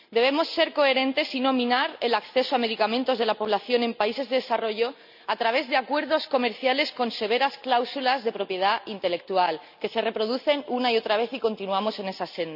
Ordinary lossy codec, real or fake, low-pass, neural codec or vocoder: none; real; 5.4 kHz; none